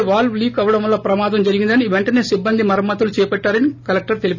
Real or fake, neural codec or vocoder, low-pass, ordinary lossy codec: real; none; 7.2 kHz; none